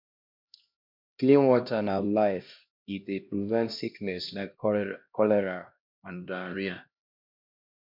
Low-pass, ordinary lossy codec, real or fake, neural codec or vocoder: 5.4 kHz; MP3, 48 kbps; fake; codec, 16 kHz, 1 kbps, X-Codec, HuBERT features, trained on LibriSpeech